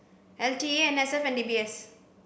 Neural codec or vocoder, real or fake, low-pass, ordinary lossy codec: none; real; none; none